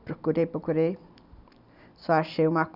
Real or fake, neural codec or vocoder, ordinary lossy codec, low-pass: real; none; none; 5.4 kHz